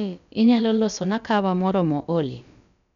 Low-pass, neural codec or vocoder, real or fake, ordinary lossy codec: 7.2 kHz; codec, 16 kHz, about 1 kbps, DyCAST, with the encoder's durations; fake; none